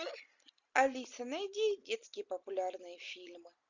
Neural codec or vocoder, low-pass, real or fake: none; 7.2 kHz; real